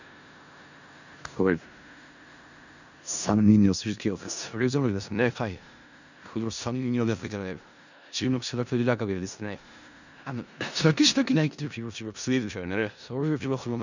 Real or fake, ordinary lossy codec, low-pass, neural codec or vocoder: fake; none; 7.2 kHz; codec, 16 kHz in and 24 kHz out, 0.4 kbps, LongCat-Audio-Codec, four codebook decoder